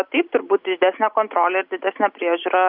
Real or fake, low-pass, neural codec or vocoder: real; 5.4 kHz; none